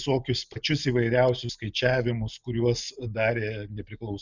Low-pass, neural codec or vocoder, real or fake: 7.2 kHz; none; real